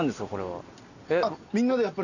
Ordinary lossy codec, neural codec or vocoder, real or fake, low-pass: none; none; real; 7.2 kHz